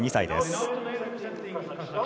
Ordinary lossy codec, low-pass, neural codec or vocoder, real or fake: none; none; none; real